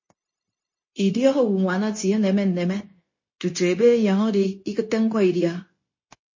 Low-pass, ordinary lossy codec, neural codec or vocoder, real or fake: 7.2 kHz; MP3, 32 kbps; codec, 16 kHz, 0.9 kbps, LongCat-Audio-Codec; fake